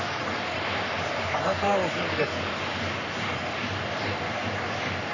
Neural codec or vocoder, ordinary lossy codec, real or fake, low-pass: codec, 44.1 kHz, 3.4 kbps, Pupu-Codec; none; fake; 7.2 kHz